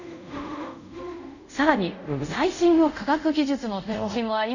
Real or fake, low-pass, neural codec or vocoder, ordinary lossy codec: fake; 7.2 kHz; codec, 24 kHz, 0.5 kbps, DualCodec; none